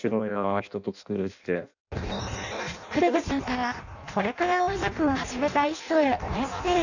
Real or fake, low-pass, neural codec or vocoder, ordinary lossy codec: fake; 7.2 kHz; codec, 16 kHz in and 24 kHz out, 0.6 kbps, FireRedTTS-2 codec; none